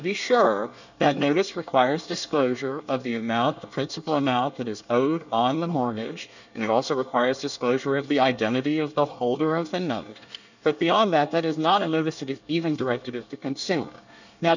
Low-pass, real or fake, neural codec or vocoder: 7.2 kHz; fake; codec, 24 kHz, 1 kbps, SNAC